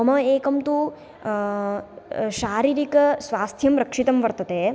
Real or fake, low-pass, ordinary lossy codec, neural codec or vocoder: real; none; none; none